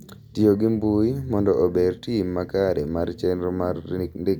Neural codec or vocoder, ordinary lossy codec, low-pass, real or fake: none; none; 19.8 kHz; real